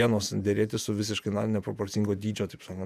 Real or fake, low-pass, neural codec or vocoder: fake; 14.4 kHz; vocoder, 48 kHz, 128 mel bands, Vocos